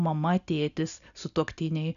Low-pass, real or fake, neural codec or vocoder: 7.2 kHz; real; none